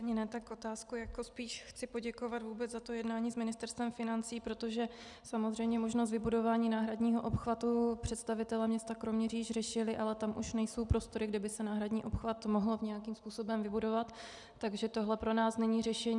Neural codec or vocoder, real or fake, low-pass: none; real; 10.8 kHz